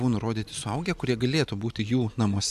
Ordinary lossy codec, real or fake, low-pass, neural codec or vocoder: AAC, 96 kbps; real; 14.4 kHz; none